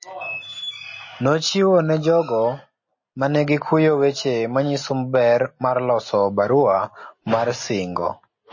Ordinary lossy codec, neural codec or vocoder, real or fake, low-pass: MP3, 32 kbps; none; real; 7.2 kHz